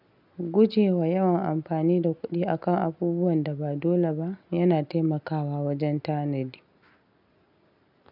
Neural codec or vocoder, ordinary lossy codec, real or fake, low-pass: none; none; real; 5.4 kHz